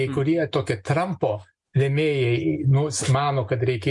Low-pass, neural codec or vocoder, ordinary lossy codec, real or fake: 10.8 kHz; none; MP3, 64 kbps; real